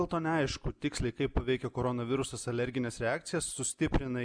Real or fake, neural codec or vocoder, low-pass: real; none; 9.9 kHz